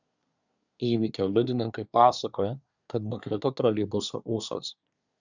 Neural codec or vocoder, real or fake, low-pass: codec, 24 kHz, 1 kbps, SNAC; fake; 7.2 kHz